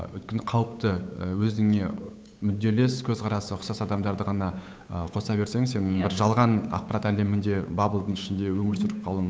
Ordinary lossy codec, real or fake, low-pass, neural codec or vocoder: none; fake; none; codec, 16 kHz, 8 kbps, FunCodec, trained on Chinese and English, 25 frames a second